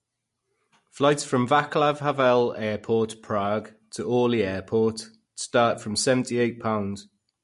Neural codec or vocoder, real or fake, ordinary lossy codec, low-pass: none; real; MP3, 48 kbps; 14.4 kHz